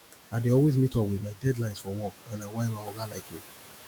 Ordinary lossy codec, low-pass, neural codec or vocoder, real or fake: none; none; autoencoder, 48 kHz, 128 numbers a frame, DAC-VAE, trained on Japanese speech; fake